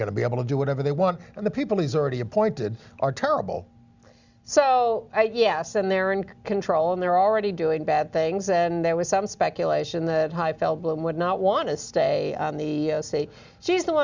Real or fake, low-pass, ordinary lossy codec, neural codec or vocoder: real; 7.2 kHz; Opus, 64 kbps; none